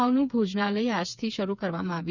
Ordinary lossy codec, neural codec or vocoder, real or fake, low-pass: none; codec, 16 kHz, 4 kbps, FreqCodec, smaller model; fake; 7.2 kHz